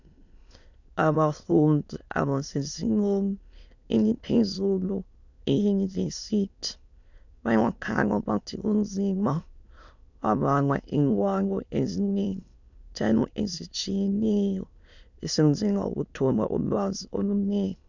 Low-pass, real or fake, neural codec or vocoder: 7.2 kHz; fake; autoencoder, 22.05 kHz, a latent of 192 numbers a frame, VITS, trained on many speakers